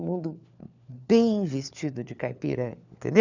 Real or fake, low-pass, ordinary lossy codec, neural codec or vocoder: fake; 7.2 kHz; none; codec, 16 kHz, 4 kbps, FunCodec, trained on LibriTTS, 50 frames a second